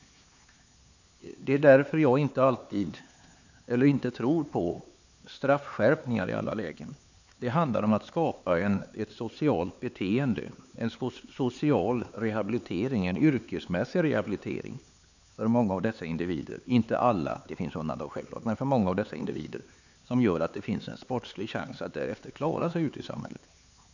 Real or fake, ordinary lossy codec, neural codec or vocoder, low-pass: fake; none; codec, 16 kHz, 4 kbps, X-Codec, HuBERT features, trained on LibriSpeech; 7.2 kHz